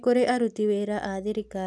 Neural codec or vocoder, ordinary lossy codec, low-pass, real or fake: none; none; none; real